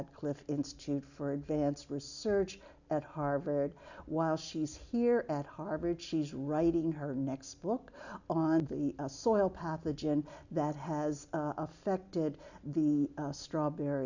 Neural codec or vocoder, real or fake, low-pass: none; real; 7.2 kHz